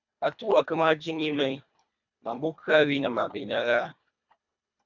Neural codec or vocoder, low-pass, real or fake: codec, 24 kHz, 1.5 kbps, HILCodec; 7.2 kHz; fake